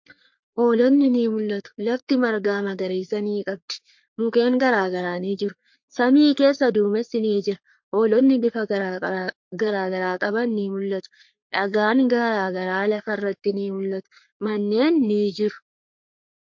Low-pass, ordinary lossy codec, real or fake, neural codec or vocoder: 7.2 kHz; MP3, 48 kbps; fake; codec, 44.1 kHz, 3.4 kbps, Pupu-Codec